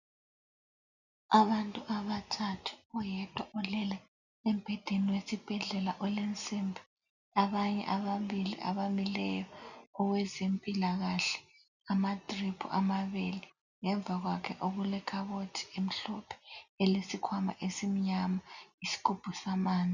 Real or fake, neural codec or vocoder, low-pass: real; none; 7.2 kHz